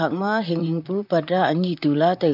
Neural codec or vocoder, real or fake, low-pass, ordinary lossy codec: vocoder, 44.1 kHz, 128 mel bands, Pupu-Vocoder; fake; 5.4 kHz; MP3, 48 kbps